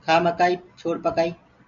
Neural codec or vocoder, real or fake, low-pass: none; real; 7.2 kHz